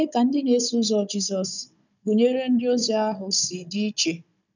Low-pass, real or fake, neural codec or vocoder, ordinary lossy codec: 7.2 kHz; fake; codec, 16 kHz, 16 kbps, FunCodec, trained on Chinese and English, 50 frames a second; none